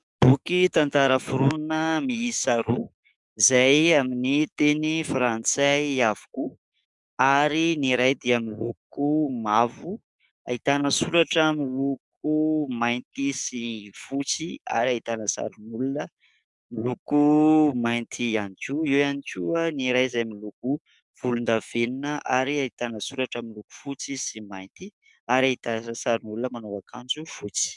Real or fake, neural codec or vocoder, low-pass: fake; codec, 44.1 kHz, 7.8 kbps, DAC; 10.8 kHz